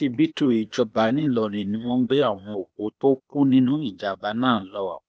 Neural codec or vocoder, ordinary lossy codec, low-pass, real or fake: codec, 16 kHz, 0.8 kbps, ZipCodec; none; none; fake